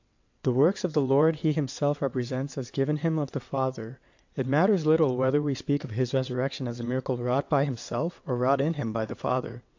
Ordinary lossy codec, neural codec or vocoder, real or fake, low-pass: AAC, 48 kbps; vocoder, 22.05 kHz, 80 mel bands, WaveNeXt; fake; 7.2 kHz